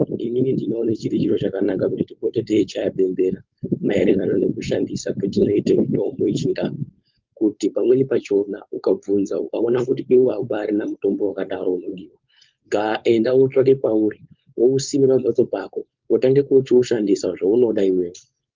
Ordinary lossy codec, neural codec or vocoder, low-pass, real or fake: Opus, 24 kbps; codec, 16 kHz, 4.8 kbps, FACodec; 7.2 kHz; fake